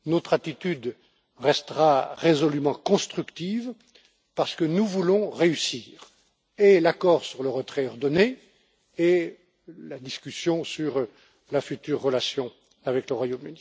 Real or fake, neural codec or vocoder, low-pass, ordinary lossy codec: real; none; none; none